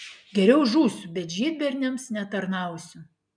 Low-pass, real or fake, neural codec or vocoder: 9.9 kHz; real; none